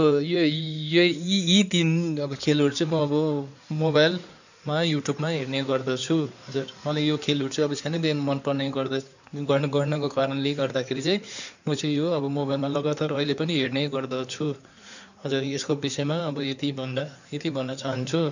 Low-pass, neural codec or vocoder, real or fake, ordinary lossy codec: 7.2 kHz; codec, 16 kHz in and 24 kHz out, 2.2 kbps, FireRedTTS-2 codec; fake; none